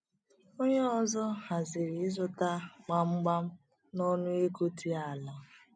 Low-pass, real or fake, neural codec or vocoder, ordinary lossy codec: 9.9 kHz; real; none; none